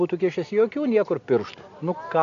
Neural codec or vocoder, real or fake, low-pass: none; real; 7.2 kHz